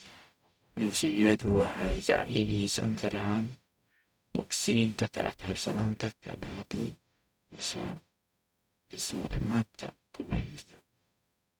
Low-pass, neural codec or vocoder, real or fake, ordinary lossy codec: 19.8 kHz; codec, 44.1 kHz, 0.9 kbps, DAC; fake; none